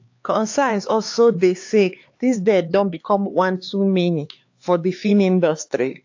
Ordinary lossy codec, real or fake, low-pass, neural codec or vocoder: AAC, 48 kbps; fake; 7.2 kHz; codec, 16 kHz, 4 kbps, X-Codec, HuBERT features, trained on LibriSpeech